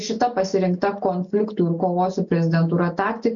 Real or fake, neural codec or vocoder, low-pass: real; none; 7.2 kHz